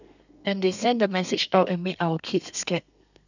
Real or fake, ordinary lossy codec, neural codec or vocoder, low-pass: fake; none; codec, 32 kHz, 1.9 kbps, SNAC; 7.2 kHz